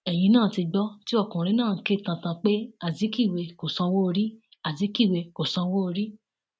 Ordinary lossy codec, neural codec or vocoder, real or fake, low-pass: none; none; real; none